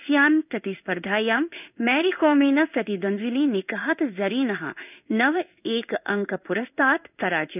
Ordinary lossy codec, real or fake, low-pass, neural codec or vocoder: none; fake; 3.6 kHz; codec, 16 kHz in and 24 kHz out, 1 kbps, XY-Tokenizer